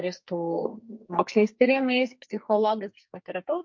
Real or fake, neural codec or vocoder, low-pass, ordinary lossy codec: fake; codec, 44.1 kHz, 2.6 kbps, SNAC; 7.2 kHz; MP3, 48 kbps